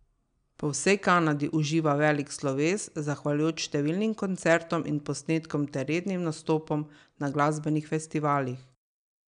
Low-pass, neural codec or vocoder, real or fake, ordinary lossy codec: 9.9 kHz; none; real; none